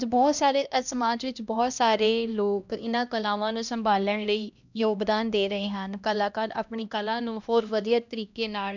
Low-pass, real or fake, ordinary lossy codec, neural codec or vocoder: 7.2 kHz; fake; none; codec, 16 kHz, 1 kbps, X-Codec, HuBERT features, trained on LibriSpeech